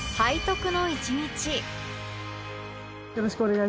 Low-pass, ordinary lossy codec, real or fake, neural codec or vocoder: none; none; real; none